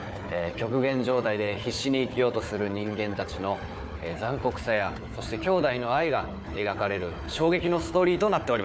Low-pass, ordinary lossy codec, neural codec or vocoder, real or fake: none; none; codec, 16 kHz, 4 kbps, FunCodec, trained on Chinese and English, 50 frames a second; fake